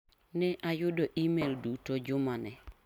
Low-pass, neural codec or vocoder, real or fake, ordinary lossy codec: 19.8 kHz; none; real; none